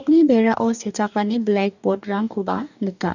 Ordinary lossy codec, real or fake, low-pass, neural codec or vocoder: none; fake; 7.2 kHz; codec, 44.1 kHz, 2.6 kbps, DAC